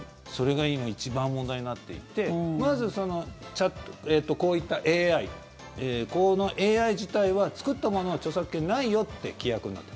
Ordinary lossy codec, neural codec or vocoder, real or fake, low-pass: none; none; real; none